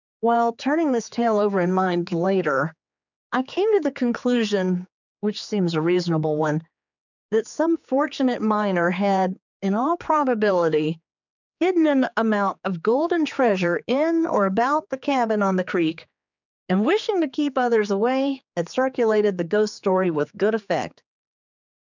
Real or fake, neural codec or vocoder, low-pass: fake; codec, 16 kHz, 4 kbps, X-Codec, HuBERT features, trained on general audio; 7.2 kHz